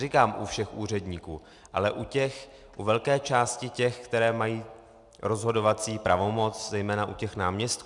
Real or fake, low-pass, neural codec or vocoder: real; 10.8 kHz; none